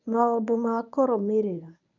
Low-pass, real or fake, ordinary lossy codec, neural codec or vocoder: 7.2 kHz; fake; none; codec, 24 kHz, 0.9 kbps, WavTokenizer, medium speech release version 1